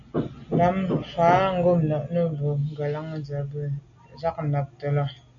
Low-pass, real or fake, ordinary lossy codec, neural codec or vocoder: 7.2 kHz; real; AAC, 64 kbps; none